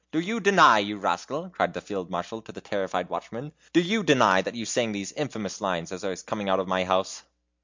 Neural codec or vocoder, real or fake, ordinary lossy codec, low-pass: none; real; MP3, 64 kbps; 7.2 kHz